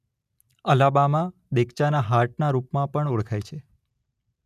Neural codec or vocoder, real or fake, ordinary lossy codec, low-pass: none; real; none; 14.4 kHz